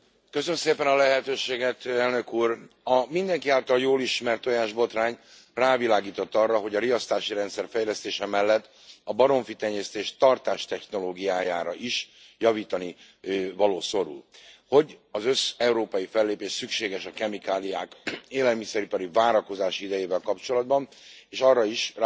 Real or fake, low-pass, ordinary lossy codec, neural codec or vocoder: real; none; none; none